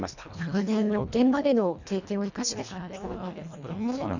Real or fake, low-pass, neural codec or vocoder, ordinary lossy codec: fake; 7.2 kHz; codec, 24 kHz, 1.5 kbps, HILCodec; none